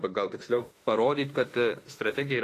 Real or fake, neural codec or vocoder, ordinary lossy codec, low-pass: fake; autoencoder, 48 kHz, 32 numbers a frame, DAC-VAE, trained on Japanese speech; AAC, 48 kbps; 14.4 kHz